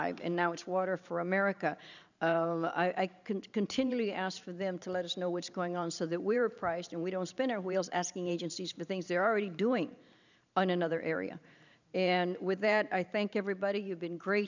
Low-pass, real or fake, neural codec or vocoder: 7.2 kHz; fake; vocoder, 44.1 kHz, 128 mel bands every 512 samples, BigVGAN v2